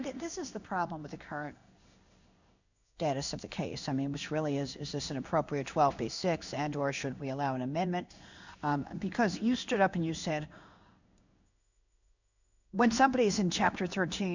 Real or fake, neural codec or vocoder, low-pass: fake; codec, 16 kHz in and 24 kHz out, 1 kbps, XY-Tokenizer; 7.2 kHz